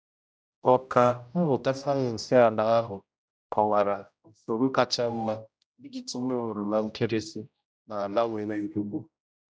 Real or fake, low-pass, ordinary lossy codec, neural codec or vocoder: fake; none; none; codec, 16 kHz, 0.5 kbps, X-Codec, HuBERT features, trained on general audio